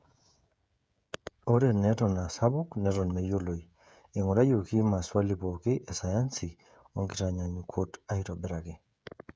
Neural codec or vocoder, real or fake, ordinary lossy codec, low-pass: codec, 16 kHz, 16 kbps, FreqCodec, smaller model; fake; none; none